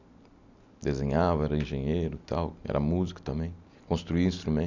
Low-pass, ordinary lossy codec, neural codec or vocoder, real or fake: 7.2 kHz; none; none; real